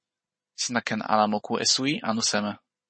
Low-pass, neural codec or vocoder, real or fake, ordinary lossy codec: 9.9 kHz; none; real; MP3, 32 kbps